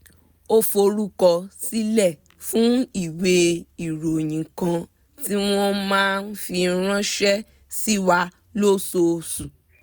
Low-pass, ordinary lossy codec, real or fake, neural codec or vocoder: none; none; real; none